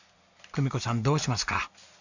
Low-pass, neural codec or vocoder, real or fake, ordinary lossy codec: 7.2 kHz; none; real; none